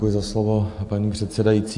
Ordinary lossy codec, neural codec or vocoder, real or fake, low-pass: AAC, 64 kbps; none; real; 10.8 kHz